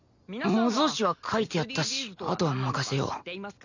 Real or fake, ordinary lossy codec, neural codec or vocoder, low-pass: real; none; none; 7.2 kHz